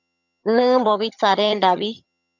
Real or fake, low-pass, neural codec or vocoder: fake; 7.2 kHz; vocoder, 22.05 kHz, 80 mel bands, HiFi-GAN